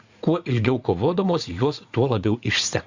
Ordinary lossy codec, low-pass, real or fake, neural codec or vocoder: Opus, 64 kbps; 7.2 kHz; fake; vocoder, 44.1 kHz, 80 mel bands, Vocos